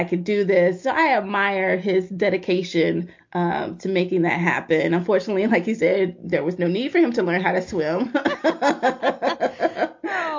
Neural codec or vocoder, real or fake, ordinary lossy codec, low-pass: none; real; MP3, 48 kbps; 7.2 kHz